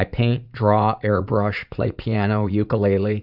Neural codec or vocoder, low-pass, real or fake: vocoder, 44.1 kHz, 80 mel bands, Vocos; 5.4 kHz; fake